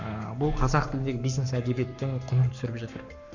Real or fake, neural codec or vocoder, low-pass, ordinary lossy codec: fake; codec, 44.1 kHz, 7.8 kbps, Pupu-Codec; 7.2 kHz; none